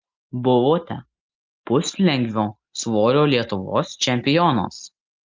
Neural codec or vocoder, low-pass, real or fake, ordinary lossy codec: none; 7.2 kHz; real; Opus, 24 kbps